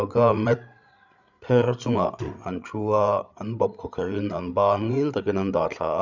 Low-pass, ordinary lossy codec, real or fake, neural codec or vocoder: 7.2 kHz; none; fake; codec, 16 kHz, 8 kbps, FreqCodec, larger model